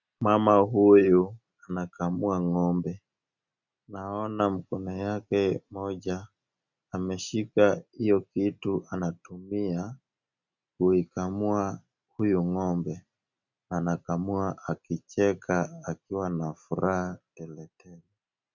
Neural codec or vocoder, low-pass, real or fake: none; 7.2 kHz; real